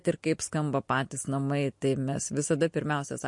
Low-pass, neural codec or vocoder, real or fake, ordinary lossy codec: 10.8 kHz; none; real; MP3, 48 kbps